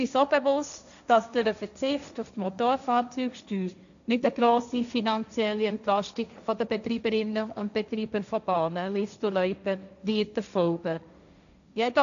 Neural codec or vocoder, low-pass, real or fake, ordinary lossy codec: codec, 16 kHz, 1.1 kbps, Voila-Tokenizer; 7.2 kHz; fake; none